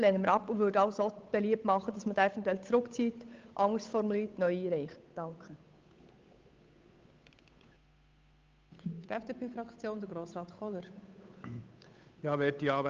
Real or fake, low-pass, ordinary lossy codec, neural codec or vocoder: fake; 7.2 kHz; Opus, 24 kbps; codec, 16 kHz, 8 kbps, FunCodec, trained on Chinese and English, 25 frames a second